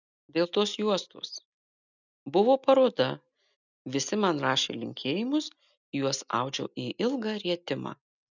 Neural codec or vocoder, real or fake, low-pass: none; real; 7.2 kHz